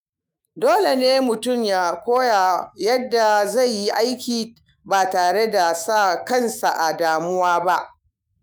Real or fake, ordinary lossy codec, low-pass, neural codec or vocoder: fake; none; none; autoencoder, 48 kHz, 128 numbers a frame, DAC-VAE, trained on Japanese speech